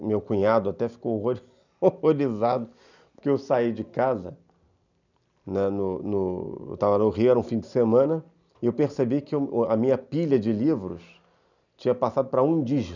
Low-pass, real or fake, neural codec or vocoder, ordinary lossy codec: 7.2 kHz; real; none; none